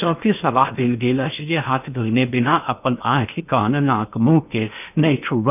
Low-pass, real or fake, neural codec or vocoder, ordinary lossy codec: 3.6 kHz; fake; codec, 16 kHz in and 24 kHz out, 0.8 kbps, FocalCodec, streaming, 65536 codes; none